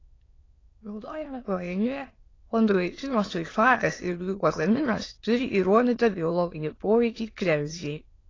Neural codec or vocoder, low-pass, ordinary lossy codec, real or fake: autoencoder, 22.05 kHz, a latent of 192 numbers a frame, VITS, trained on many speakers; 7.2 kHz; AAC, 32 kbps; fake